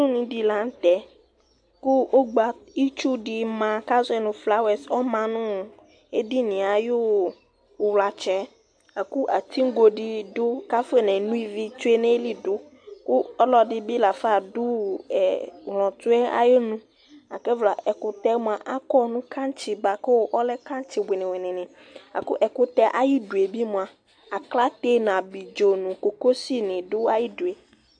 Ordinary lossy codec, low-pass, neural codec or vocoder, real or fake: AAC, 64 kbps; 9.9 kHz; none; real